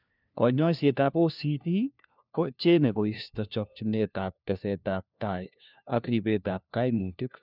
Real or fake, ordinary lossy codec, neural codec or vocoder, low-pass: fake; none; codec, 16 kHz, 1 kbps, FunCodec, trained on LibriTTS, 50 frames a second; 5.4 kHz